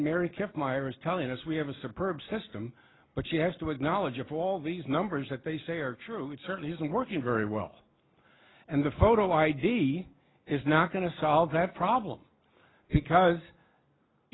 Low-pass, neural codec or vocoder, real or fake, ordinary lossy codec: 7.2 kHz; none; real; AAC, 16 kbps